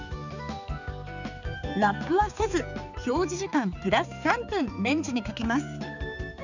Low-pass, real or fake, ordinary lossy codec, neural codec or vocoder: 7.2 kHz; fake; none; codec, 16 kHz, 4 kbps, X-Codec, HuBERT features, trained on balanced general audio